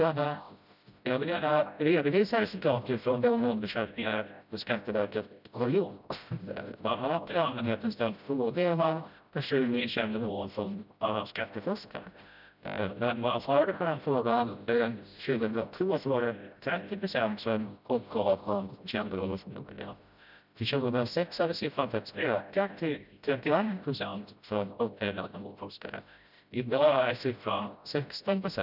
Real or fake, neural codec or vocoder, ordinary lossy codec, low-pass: fake; codec, 16 kHz, 0.5 kbps, FreqCodec, smaller model; none; 5.4 kHz